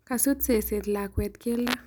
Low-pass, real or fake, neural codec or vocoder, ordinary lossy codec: none; real; none; none